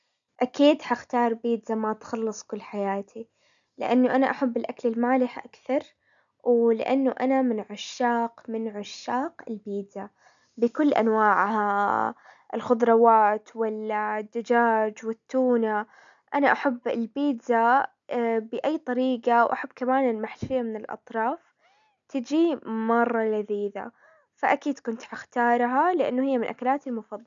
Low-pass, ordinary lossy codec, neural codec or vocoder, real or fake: 7.2 kHz; none; none; real